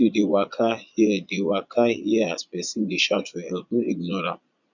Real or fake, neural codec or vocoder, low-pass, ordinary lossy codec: fake; vocoder, 22.05 kHz, 80 mel bands, Vocos; 7.2 kHz; none